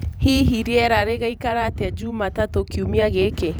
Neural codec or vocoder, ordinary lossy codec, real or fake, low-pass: vocoder, 44.1 kHz, 128 mel bands every 512 samples, BigVGAN v2; none; fake; none